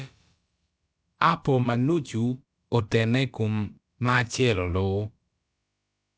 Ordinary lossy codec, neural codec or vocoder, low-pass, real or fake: none; codec, 16 kHz, about 1 kbps, DyCAST, with the encoder's durations; none; fake